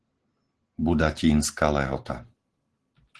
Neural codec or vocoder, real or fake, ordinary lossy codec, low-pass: none; real; Opus, 16 kbps; 10.8 kHz